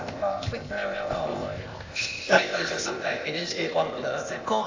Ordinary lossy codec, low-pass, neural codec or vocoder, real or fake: AAC, 48 kbps; 7.2 kHz; codec, 16 kHz, 0.8 kbps, ZipCodec; fake